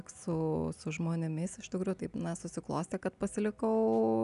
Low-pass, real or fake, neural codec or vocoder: 10.8 kHz; real; none